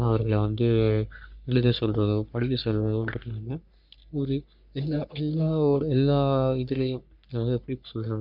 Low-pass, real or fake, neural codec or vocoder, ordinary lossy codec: 5.4 kHz; fake; codec, 44.1 kHz, 3.4 kbps, Pupu-Codec; none